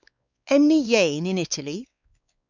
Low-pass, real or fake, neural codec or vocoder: 7.2 kHz; fake; codec, 16 kHz, 4 kbps, X-Codec, WavLM features, trained on Multilingual LibriSpeech